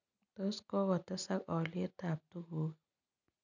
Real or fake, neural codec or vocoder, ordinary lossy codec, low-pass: real; none; none; 7.2 kHz